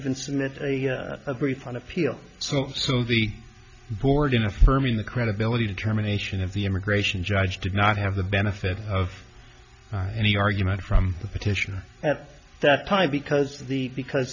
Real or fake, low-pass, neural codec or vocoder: real; 7.2 kHz; none